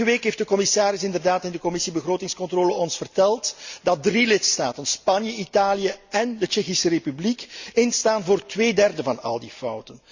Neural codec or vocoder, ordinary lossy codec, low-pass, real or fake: none; Opus, 64 kbps; 7.2 kHz; real